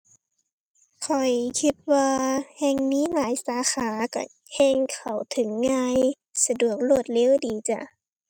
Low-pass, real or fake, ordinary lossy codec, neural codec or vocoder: 19.8 kHz; real; none; none